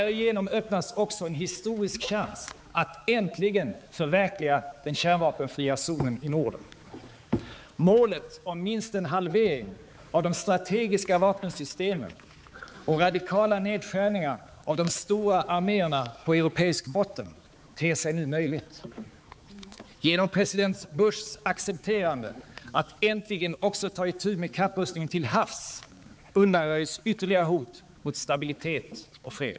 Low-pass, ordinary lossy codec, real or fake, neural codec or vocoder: none; none; fake; codec, 16 kHz, 4 kbps, X-Codec, HuBERT features, trained on balanced general audio